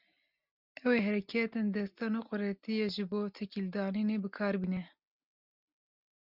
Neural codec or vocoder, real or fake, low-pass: none; real; 5.4 kHz